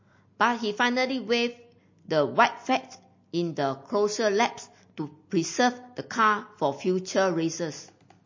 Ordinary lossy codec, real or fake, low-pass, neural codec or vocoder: MP3, 32 kbps; real; 7.2 kHz; none